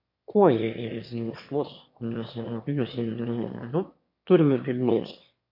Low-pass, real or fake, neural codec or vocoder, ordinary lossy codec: 5.4 kHz; fake; autoencoder, 22.05 kHz, a latent of 192 numbers a frame, VITS, trained on one speaker; MP3, 32 kbps